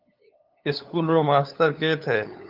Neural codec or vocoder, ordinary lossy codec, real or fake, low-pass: codec, 16 kHz, 8 kbps, FunCodec, trained on LibriTTS, 25 frames a second; Opus, 24 kbps; fake; 5.4 kHz